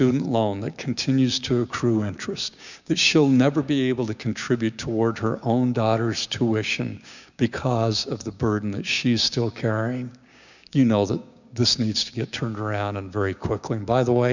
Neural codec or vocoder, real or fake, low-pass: codec, 16 kHz, 6 kbps, DAC; fake; 7.2 kHz